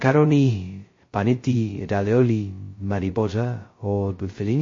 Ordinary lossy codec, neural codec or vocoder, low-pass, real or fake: MP3, 32 kbps; codec, 16 kHz, 0.2 kbps, FocalCodec; 7.2 kHz; fake